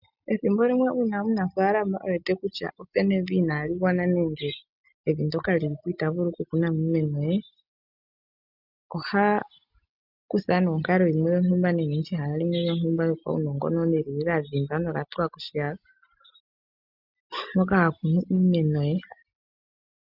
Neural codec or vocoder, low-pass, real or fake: none; 5.4 kHz; real